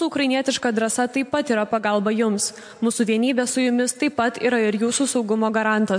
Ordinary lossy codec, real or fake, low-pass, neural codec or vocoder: MP3, 64 kbps; real; 9.9 kHz; none